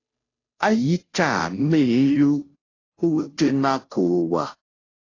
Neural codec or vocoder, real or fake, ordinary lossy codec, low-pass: codec, 16 kHz, 0.5 kbps, FunCodec, trained on Chinese and English, 25 frames a second; fake; AAC, 32 kbps; 7.2 kHz